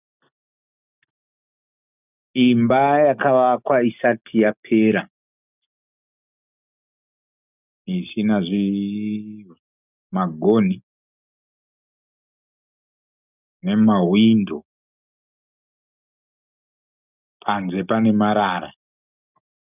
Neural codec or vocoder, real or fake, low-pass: none; real; 3.6 kHz